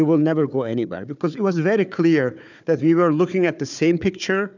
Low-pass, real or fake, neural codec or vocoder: 7.2 kHz; fake; codec, 16 kHz, 4 kbps, FunCodec, trained on Chinese and English, 50 frames a second